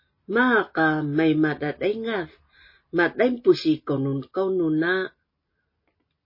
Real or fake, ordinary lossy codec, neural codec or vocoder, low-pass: real; MP3, 24 kbps; none; 5.4 kHz